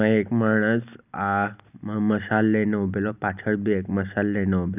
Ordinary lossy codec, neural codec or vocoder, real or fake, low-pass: none; none; real; 3.6 kHz